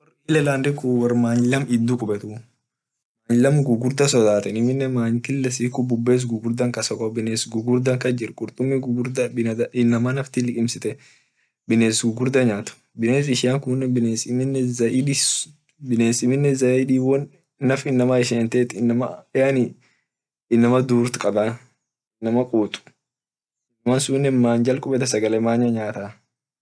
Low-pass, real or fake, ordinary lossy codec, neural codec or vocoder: none; real; none; none